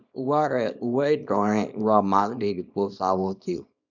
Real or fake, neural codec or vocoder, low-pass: fake; codec, 24 kHz, 0.9 kbps, WavTokenizer, small release; 7.2 kHz